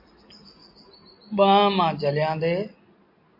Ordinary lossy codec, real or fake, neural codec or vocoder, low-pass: MP3, 32 kbps; real; none; 5.4 kHz